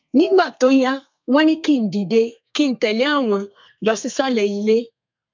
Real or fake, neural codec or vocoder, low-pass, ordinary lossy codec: fake; codec, 32 kHz, 1.9 kbps, SNAC; 7.2 kHz; MP3, 64 kbps